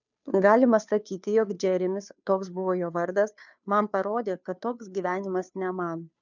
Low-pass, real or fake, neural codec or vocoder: 7.2 kHz; fake; codec, 16 kHz, 2 kbps, FunCodec, trained on Chinese and English, 25 frames a second